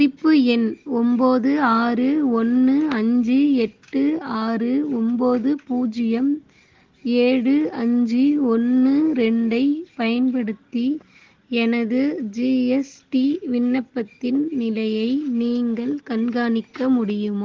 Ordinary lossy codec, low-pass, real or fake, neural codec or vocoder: Opus, 16 kbps; 7.2 kHz; real; none